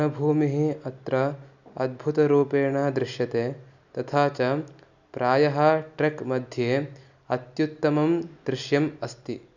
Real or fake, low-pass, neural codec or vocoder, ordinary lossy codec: real; 7.2 kHz; none; none